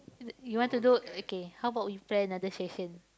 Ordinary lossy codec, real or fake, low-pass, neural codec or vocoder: none; real; none; none